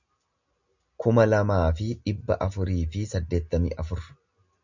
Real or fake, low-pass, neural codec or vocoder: real; 7.2 kHz; none